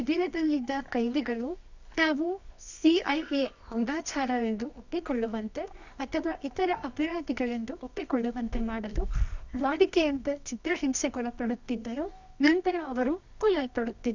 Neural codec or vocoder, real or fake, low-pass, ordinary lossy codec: codec, 24 kHz, 0.9 kbps, WavTokenizer, medium music audio release; fake; 7.2 kHz; none